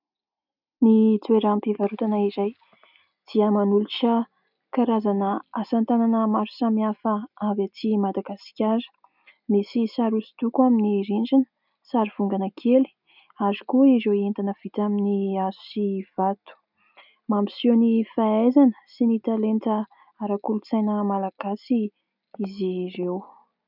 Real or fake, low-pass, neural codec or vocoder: real; 5.4 kHz; none